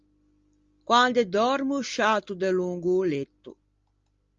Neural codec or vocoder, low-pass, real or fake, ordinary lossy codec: none; 7.2 kHz; real; Opus, 32 kbps